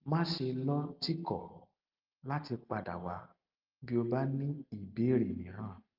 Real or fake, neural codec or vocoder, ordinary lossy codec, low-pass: real; none; Opus, 32 kbps; 5.4 kHz